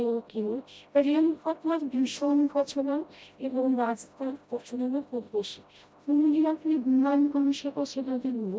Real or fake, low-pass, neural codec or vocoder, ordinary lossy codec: fake; none; codec, 16 kHz, 0.5 kbps, FreqCodec, smaller model; none